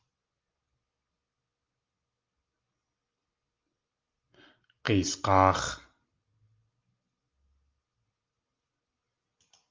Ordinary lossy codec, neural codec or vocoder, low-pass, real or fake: Opus, 24 kbps; none; 7.2 kHz; real